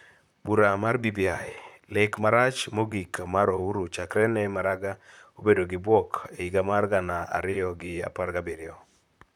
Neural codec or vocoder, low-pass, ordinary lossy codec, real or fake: vocoder, 44.1 kHz, 128 mel bands, Pupu-Vocoder; 19.8 kHz; none; fake